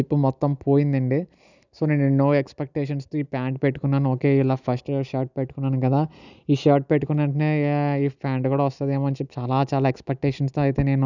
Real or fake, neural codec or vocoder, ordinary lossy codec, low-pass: real; none; none; 7.2 kHz